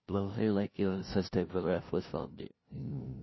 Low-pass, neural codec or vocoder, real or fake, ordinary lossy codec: 7.2 kHz; codec, 16 kHz, 0.5 kbps, FunCodec, trained on LibriTTS, 25 frames a second; fake; MP3, 24 kbps